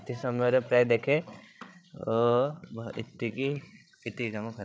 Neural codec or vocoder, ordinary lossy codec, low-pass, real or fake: codec, 16 kHz, 16 kbps, FreqCodec, larger model; none; none; fake